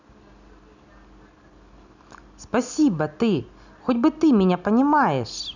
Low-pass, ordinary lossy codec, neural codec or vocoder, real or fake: 7.2 kHz; none; none; real